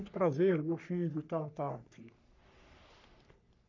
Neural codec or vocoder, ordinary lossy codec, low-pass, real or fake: codec, 44.1 kHz, 3.4 kbps, Pupu-Codec; none; 7.2 kHz; fake